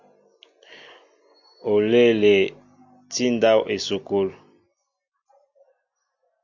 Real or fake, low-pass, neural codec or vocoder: real; 7.2 kHz; none